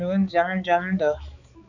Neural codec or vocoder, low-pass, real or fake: codec, 16 kHz, 4 kbps, X-Codec, HuBERT features, trained on balanced general audio; 7.2 kHz; fake